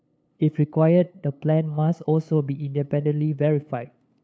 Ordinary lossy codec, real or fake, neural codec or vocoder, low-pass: none; fake; codec, 16 kHz, 8 kbps, FunCodec, trained on LibriTTS, 25 frames a second; none